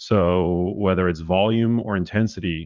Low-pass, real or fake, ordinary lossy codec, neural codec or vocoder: 7.2 kHz; fake; Opus, 32 kbps; vocoder, 44.1 kHz, 80 mel bands, Vocos